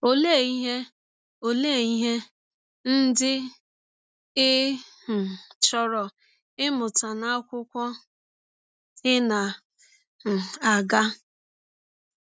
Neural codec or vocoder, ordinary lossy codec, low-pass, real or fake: none; none; none; real